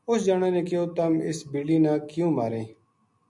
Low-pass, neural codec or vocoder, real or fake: 10.8 kHz; none; real